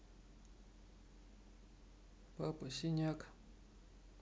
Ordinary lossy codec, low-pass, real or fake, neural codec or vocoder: none; none; real; none